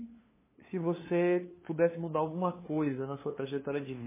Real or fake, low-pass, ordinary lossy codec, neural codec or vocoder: fake; 3.6 kHz; MP3, 16 kbps; codec, 16 kHz, 2 kbps, FunCodec, trained on LibriTTS, 25 frames a second